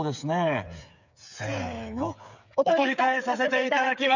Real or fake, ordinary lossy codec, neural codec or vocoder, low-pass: fake; none; codec, 16 kHz, 8 kbps, FreqCodec, smaller model; 7.2 kHz